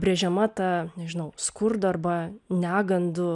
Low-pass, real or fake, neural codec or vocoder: 10.8 kHz; real; none